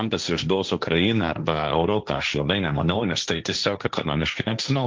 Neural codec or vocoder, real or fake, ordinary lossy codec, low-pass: codec, 16 kHz, 1.1 kbps, Voila-Tokenizer; fake; Opus, 24 kbps; 7.2 kHz